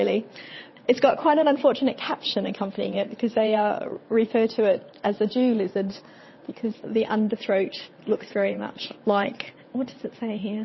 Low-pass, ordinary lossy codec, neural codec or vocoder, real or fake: 7.2 kHz; MP3, 24 kbps; vocoder, 22.05 kHz, 80 mel bands, WaveNeXt; fake